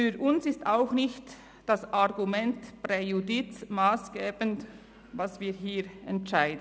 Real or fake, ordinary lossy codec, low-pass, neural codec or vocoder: real; none; none; none